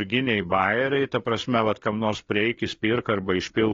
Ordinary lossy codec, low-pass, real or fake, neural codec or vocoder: AAC, 32 kbps; 7.2 kHz; fake; codec, 16 kHz, 4 kbps, FreqCodec, larger model